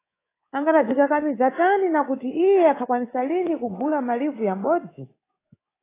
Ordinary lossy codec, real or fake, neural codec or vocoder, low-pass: AAC, 16 kbps; fake; codec, 24 kHz, 3.1 kbps, DualCodec; 3.6 kHz